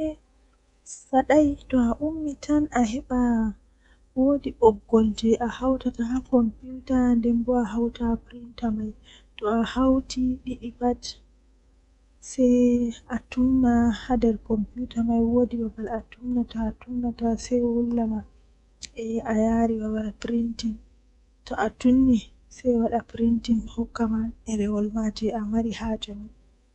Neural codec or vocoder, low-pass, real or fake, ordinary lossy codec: codec, 24 kHz, 3.1 kbps, DualCodec; 10.8 kHz; fake; none